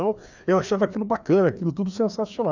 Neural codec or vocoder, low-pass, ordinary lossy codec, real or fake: codec, 16 kHz, 2 kbps, FreqCodec, larger model; 7.2 kHz; none; fake